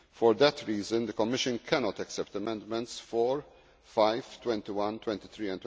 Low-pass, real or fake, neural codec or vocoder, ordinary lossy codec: none; real; none; none